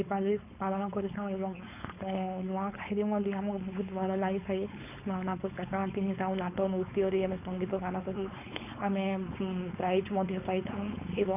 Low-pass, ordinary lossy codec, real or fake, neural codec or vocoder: 3.6 kHz; none; fake; codec, 16 kHz, 4.8 kbps, FACodec